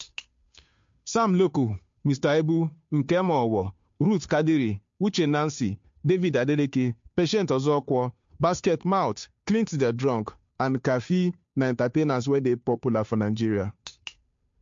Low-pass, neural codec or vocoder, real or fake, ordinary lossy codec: 7.2 kHz; codec, 16 kHz, 2 kbps, FunCodec, trained on Chinese and English, 25 frames a second; fake; MP3, 48 kbps